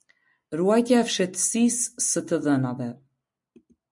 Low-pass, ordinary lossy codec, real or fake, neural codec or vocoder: 10.8 kHz; MP3, 64 kbps; real; none